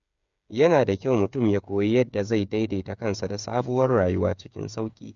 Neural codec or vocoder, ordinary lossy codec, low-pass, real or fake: codec, 16 kHz, 8 kbps, FreqCodec, smaller model; none; 7.2 kHz; fake